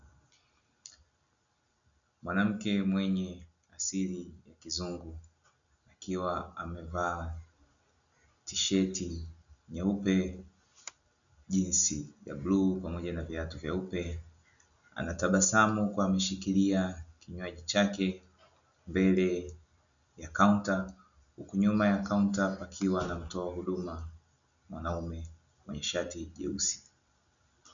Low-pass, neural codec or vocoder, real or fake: 7.2 kHz; none; real